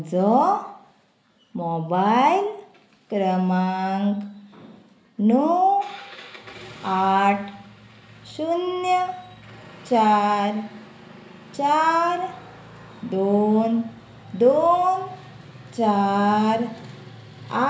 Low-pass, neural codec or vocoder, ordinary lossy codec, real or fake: none; none; none; real